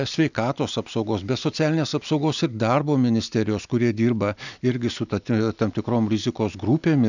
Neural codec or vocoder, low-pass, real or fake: none; 7.2 kHz; real